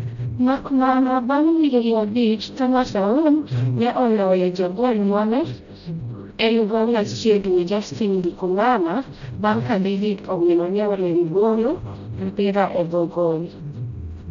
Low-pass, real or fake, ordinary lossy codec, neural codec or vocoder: 7.2 kHz; fake; none; codec, 16 kHz, 0.5 kbps, FreqCodec, smaller model